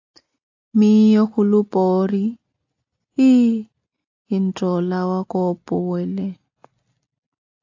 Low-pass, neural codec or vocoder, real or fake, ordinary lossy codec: 7.2 kHz; none; real; AAC, 48 kbps